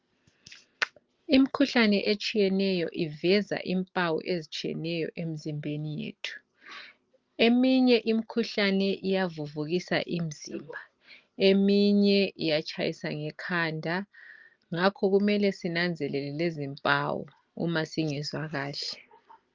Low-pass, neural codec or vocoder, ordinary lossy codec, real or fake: 7.2 kHz; none; Opus, 24 kbps; real